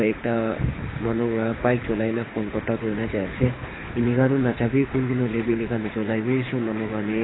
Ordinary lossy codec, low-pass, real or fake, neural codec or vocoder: AAC, 16 kbps; 7.2 kHz; fake; codec, 24 kHz, 6 kbps, HILCodec